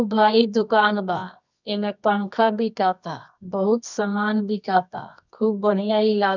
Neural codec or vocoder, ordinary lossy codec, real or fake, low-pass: codec, 24 kHz, 0.9 kbps, WavTokenizer, medium music audio release; none; fake; 7.2 kHz